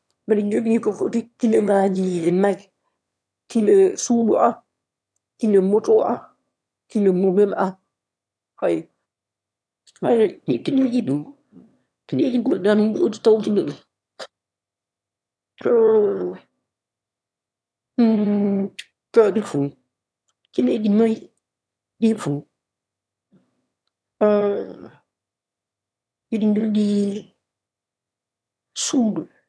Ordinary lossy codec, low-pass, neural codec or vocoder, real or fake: none; none; autoencoder, 22.05 kHz, a latent of 192 numbers a frame, VITS, trained on one speaker; fake